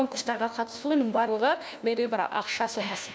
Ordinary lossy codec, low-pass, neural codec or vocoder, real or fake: none; none; codec, 16 kHz, 1 kbps, FunCodec, trained on LibriTTS, 50 frames a second; fake